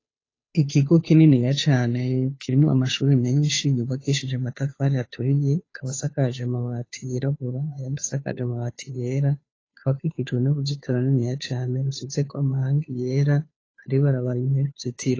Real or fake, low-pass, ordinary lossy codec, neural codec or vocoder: fake; 7.2 kHz; AAC, 32 kbps; codec, 16 kHz, 2 kbps, FunCodec, trained on Chinese and English, 25 frames a second